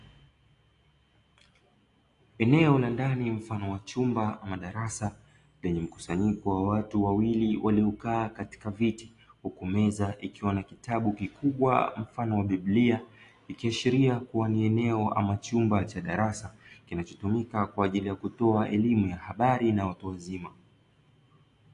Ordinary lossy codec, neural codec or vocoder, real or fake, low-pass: AAC, 48 kbps; none; real; 10.8 kHz